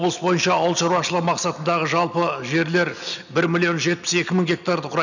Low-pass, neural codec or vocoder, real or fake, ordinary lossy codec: 7.2 kHz; none; real; none